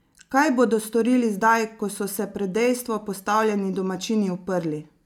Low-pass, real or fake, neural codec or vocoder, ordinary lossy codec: 19.8 kHz; real; none; none